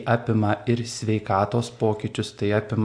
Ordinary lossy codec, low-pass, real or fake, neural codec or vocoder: AAC, 64 kbps; 9.9 kHz; real; none